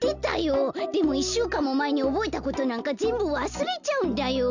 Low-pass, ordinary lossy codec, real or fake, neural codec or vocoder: 7.2 kHz; Opus, 64 kbps; real; none